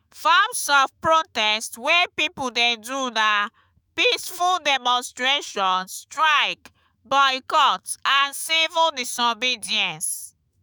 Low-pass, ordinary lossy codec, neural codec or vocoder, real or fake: none; none; autoencoder, 48 kHz, 128 numbers a frame, DAC-VAE, trained on Japanese speech; fake